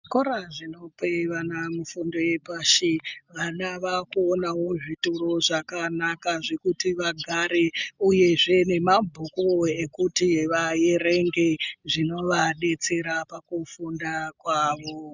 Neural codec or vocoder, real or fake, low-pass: none; real; 7.2 kHz